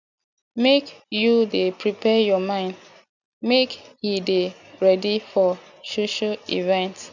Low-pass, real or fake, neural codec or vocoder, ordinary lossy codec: 7.2 kHz; real; none; none